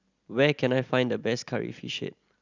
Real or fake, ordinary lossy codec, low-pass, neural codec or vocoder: real; Opus, 64 kbps; 7.2 kHz; none